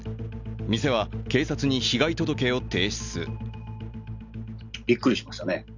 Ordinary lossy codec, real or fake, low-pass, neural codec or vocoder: none; real; 7.2 kHz; none